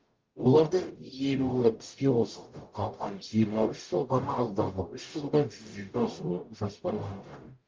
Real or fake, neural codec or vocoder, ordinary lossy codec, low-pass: fake; codec, 44.1 kHz, 0.9 kbps, DAC; Opus, 24 kbps; 7.2 kHz